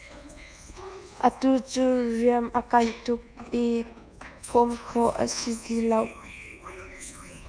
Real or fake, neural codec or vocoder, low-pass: fake; codec, 24 kHz, 1.2 kbps, DualCodec; 9.9 kHz